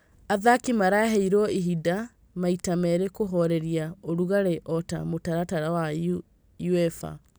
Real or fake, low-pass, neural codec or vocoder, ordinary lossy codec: real; none; none; none